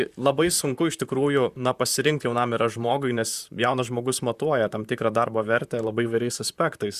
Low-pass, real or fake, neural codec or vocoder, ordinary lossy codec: 14.4 kHz; fake; vocoder, 44.1 kHz, 128 mel bands, Pupu-Vocoder; Opus, 64 kbps